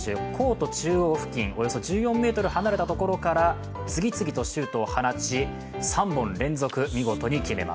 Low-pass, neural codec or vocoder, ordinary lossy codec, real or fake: none; none; none; real